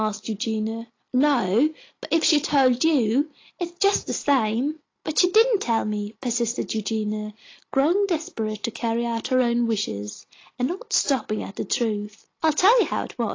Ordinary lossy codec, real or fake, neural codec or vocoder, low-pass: AAC, 32 kbps; real; none; 7.2 kHz